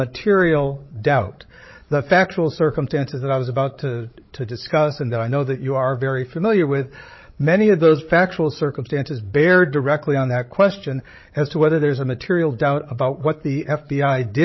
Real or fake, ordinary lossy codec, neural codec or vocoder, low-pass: fake; MP3, 24 kbps; codec, 16 kHz, 16 kbps, FreqCodec, larger model; 7.2 kHz